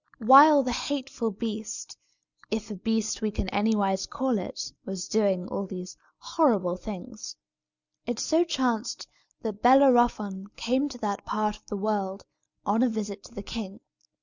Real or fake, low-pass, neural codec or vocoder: real; 7.2 kHz; none